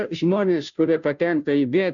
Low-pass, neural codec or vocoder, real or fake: 7.2 kHz; codec, 16 kHz, 0.5 kbps, FunCodec, trained on Chinese and English, 25 frames a second; fake